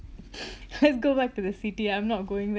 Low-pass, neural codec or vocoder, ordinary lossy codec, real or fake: none; none; none; real